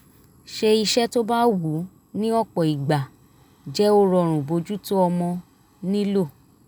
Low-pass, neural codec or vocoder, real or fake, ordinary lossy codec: none; none; real; none